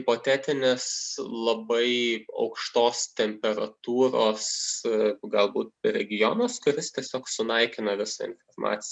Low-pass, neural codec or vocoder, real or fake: 9.9 kHz; none; real